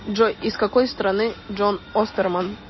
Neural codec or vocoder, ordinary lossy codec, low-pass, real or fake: none; MP3, 24 kbps; 7.2 kHz; real